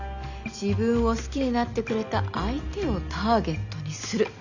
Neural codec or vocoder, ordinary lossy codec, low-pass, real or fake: none; none; 7.2 kHz; real